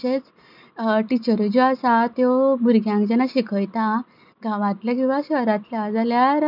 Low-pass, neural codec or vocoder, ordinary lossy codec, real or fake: 5.4 kHz; none; none; real